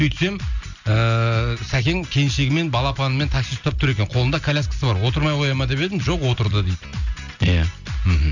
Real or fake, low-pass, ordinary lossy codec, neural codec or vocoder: real; 7.2 kHz; none; none